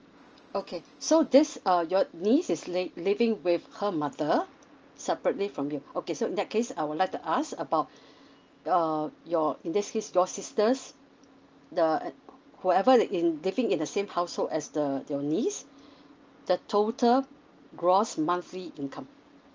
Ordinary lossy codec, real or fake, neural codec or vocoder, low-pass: Opus, 24 kbps; real; none; 7.2 kHz